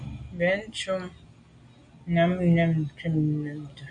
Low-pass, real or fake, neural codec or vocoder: 9.9 kHz; real; none